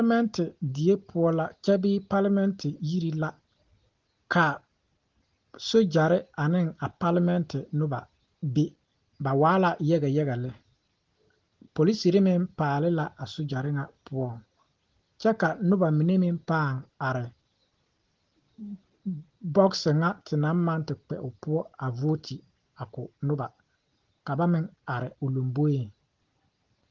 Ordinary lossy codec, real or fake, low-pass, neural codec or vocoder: Opus, 16 kbps; real; 7.2 kHz; none